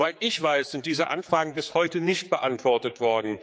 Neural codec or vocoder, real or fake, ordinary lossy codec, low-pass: codec, 16 kHz, 4 kbps, X-Codec, HuBERT features, trained on general audio; fake; none; none